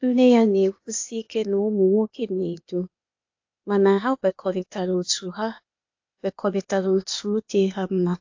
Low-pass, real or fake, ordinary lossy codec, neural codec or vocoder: 7.2 kHz; fake; AAC, 48 kbps; codec, 16 kHz, 0.8 kbps, ZipCodec